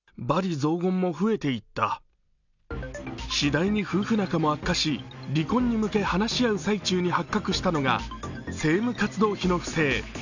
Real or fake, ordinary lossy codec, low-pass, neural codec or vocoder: real; none; 7.2 kHz; none